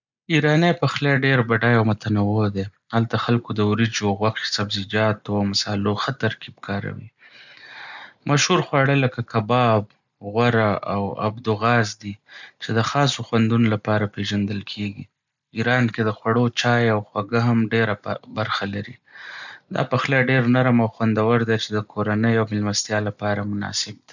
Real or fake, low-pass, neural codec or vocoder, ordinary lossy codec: real; 7.2 kHz; none; none